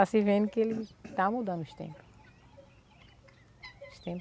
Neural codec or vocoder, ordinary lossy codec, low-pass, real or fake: none; none; none; real